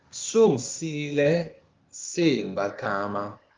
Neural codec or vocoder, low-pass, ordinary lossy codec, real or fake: codec, 16 kHz, 0.8 kbps, ZipCodec; 7.2 kHz; Opus, 32 kbps; fake